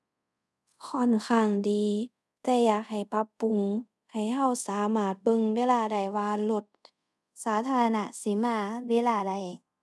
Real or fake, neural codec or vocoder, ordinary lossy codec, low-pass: fake; codec, 24 kHz, 0.5 kbps, DualCodec; none; none